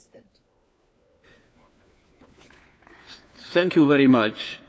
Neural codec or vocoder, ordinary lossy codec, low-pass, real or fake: codec, 16 kHz, 4 kbps, FunCodec, trained on LibriTTS, 50 frames a second; none; none; fake